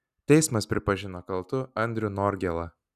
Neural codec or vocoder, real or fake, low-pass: none; real; 14.4 kHz